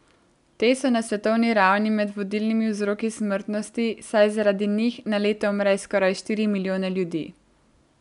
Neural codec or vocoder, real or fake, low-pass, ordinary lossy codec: none; real; 10.8 kHz; none